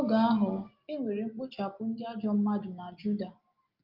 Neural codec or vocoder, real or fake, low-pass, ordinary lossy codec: none; real; 5.4 kHz; Opus, 24 kbps